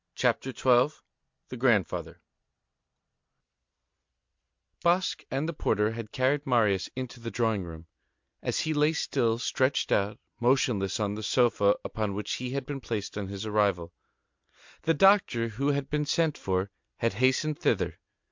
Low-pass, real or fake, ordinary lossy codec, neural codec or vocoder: 7.2 kHz; real; MP3, 64 kbps; none